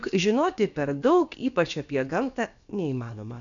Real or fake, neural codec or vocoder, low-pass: fake; codec, 16 kHz, about 1 kbps, DyCAST, with the encoder's durations; 7.2 kHz